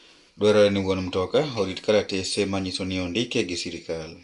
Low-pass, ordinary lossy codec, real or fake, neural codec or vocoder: 10.8 kHz; Opus, 64 kbps; real; none